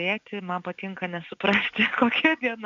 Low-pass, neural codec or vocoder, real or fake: 7.2 kHz; none; real